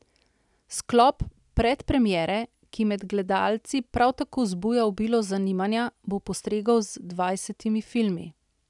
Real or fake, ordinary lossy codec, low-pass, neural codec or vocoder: real; none; 10.8 kHz; none